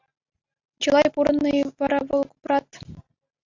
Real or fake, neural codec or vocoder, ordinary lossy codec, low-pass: real; none; AAC, 48 kbps; 7.2 kHz